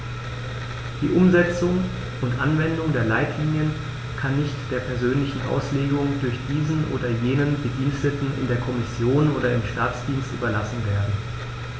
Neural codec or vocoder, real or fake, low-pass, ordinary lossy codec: none; real; none; none